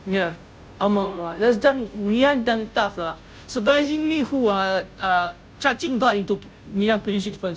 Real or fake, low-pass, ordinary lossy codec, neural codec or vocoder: fake; none; none; codec, 16 kHz, 0.5 kbps, FunCodec, trained on Chinese and English, 25 frames a second